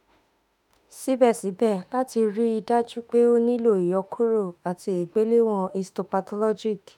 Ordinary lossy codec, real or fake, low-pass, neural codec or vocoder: none; fake; 19.8 kHz; autoencoder, 48 kHz, 32 numbers a frame, DAC-VAE, trained on Japanese speech